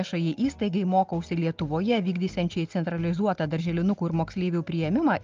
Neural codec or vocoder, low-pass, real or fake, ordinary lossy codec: none; 7.2 kHz; real; Opus, 24 kbps